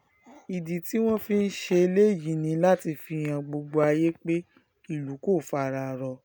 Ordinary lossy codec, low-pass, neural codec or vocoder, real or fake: none; none; none; real